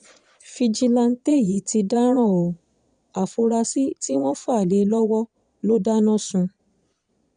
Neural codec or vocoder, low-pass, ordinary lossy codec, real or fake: vocoder, 22.05 kHz, 80 mel bands, Vocos; 9.9 kHz; none; fake